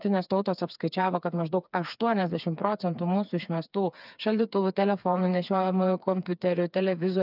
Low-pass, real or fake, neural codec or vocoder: 5.4 kHz; fake; codec, 16 kHz, 4 kbps, FreqCodec, smaller model